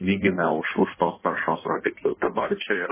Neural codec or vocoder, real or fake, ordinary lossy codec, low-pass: codec, 16 kHz in and 24 kHz out, 1.1 kbps, FireRedTTS-2 codec; fake; MP3, 16 kbps; 3.6 kHz